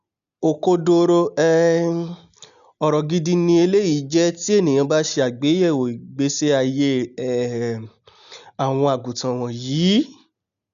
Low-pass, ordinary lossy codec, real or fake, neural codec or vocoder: 7.2 kHz; none; real; none